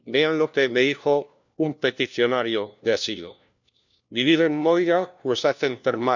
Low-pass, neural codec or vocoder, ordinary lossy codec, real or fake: 7.2 kHz; codec, 16 kHz, 1 kbps, FunCodec, trained on LibriTTS, 50 frames a second; none; fake